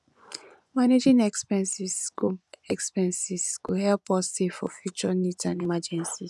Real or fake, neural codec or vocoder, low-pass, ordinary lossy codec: fake; vocoder, 24 kHz, 100 mel bands, Vocos; none; none